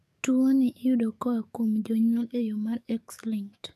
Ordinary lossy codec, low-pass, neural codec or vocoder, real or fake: none; 14.4 kHz; codec, 44.1 kHz, 7.8 kbps, DAC; fake